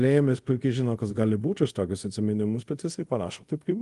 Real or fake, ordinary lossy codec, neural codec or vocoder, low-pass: fake; Opus, 24 kbps; codec, 24 kHz, 0.5 kbps, DualCodec; 10.8 kHz